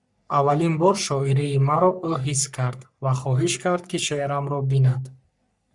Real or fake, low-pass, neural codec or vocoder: fake; 10.8 kHz; codec, 44.1 kHz, 3.4 kbps, Pupu-Codec